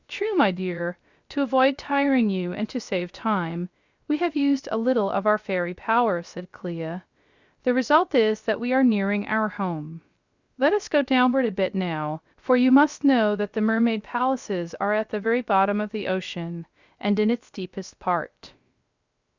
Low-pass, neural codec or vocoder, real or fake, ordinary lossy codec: 7.2 kHz; codec, 16 kHz, 0.3 kbps, FocalCodec; fake; Opus, 64 kbps